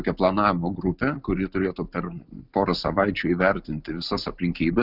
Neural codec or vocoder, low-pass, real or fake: none; 5.4 kHz; real